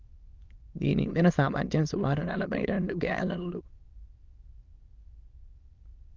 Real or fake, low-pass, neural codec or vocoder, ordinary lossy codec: fake; 7.2 kHz; autoencoder, 22.05 kHz, a latent of 192 numbers a frame, VITS, trained on many speakers; Opus, 24 kbps